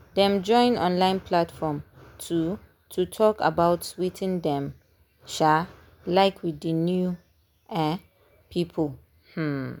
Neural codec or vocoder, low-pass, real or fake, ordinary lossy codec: none; none; real; none